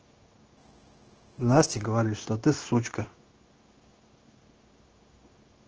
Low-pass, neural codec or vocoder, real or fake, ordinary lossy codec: 7.2 kHz; codec, 16 kHz, 0.9 kbps, LongCat-Audio-Codec; fake; Opus, 16 kbps